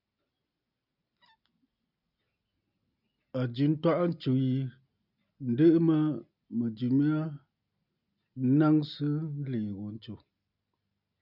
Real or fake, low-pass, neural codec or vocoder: real; 5.4 kHz; none